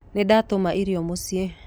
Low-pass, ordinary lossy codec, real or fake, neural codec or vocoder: none; none; real; none